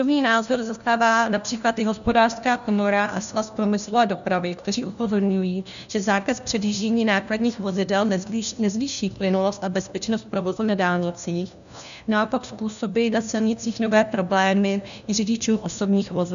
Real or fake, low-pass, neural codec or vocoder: fake; 7.2 kHz; codec, 16 kHz, 1 kbps, FunCodec, trained on LibriTTS, 50 frames a second